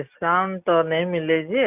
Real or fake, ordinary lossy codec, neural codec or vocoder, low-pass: real; none; none; 3.6 kHz